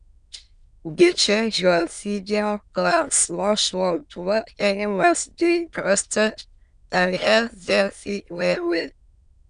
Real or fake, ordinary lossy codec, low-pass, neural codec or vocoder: fake; none; 9.9 kHz; autoencoder, 22.05 kHz, a latent of 192 numbers a frame, VITS, trained on many speakers